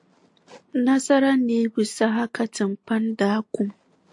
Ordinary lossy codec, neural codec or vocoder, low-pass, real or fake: AAC, 64 kbps; none; 10.8 kHz; real